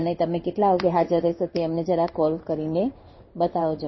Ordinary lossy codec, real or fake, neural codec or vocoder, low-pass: MP3, 24 kbps; fake; codec, 16 kHz in and 24 kHz out, 1 kbps, XY-Tokenizer; 7.2 kHz